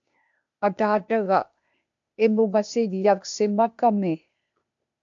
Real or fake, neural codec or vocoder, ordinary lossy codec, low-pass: fake; codec, 16 kHz, 0.8 kbps, ZipCodec; AAC, 64 kbps; 7.2 kHz